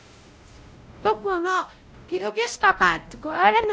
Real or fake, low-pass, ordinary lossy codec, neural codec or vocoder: fake; none; none; codec, 16 kHz, 0.5 kbps, X-Codec, WavLM features, trained on Multilingual LibriSpeech